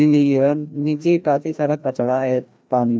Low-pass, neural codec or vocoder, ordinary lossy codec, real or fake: none; codec, 16 kHz, 1 kbps, FreqCodec, larger model; none; fake